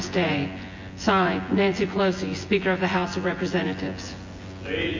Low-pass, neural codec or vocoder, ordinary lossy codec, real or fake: 7.2 kHz; vocoder, 24 kHz, 100 mel bands, Vocos; MP3, 32 kbps; fake